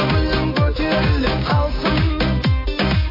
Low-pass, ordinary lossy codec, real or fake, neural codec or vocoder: 5.4 kHz; MP3, 24 kbps; fake; codec, 16 kHz, 6 kbps, DAC